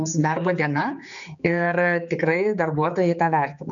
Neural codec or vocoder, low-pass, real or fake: codec, 16 kHz, 4 kbps, X-Codec, HuBERT features, trained on general audio; 7.2 kHz; fake